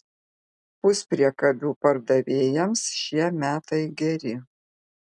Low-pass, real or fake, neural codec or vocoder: 10.8 kHz; real; none